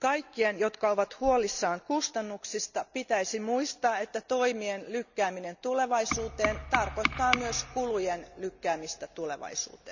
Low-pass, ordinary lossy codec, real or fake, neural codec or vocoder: 7.2 kHz; none; real; none